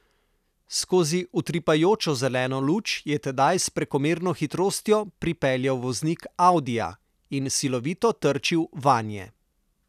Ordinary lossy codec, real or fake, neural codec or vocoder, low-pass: none; real; none; 14.4 kHz